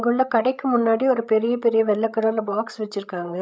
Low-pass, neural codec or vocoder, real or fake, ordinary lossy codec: none; codec, 16 kHz, 8 kbps, FreqCodec, larger model; fake; none